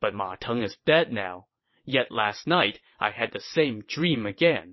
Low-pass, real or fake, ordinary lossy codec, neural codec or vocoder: 7.2 kHz; fake; MP3, 24 kbps; vocoder, 22.05 kHz, 80 mel bands, WaveNeXt